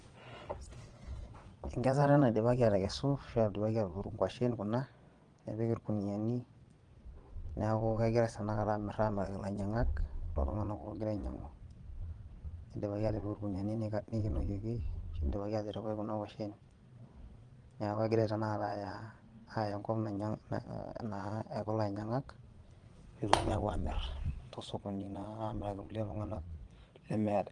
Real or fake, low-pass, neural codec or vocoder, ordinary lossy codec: fake; 9.9 kHz; vocoder, 22.05 kHz, 80 mel bands, Vocos; Opus, 24 kbps